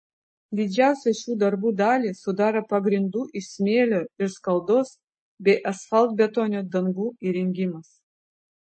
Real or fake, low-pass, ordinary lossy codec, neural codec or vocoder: real; 9.9 kHz; MP3, 32 kbps; none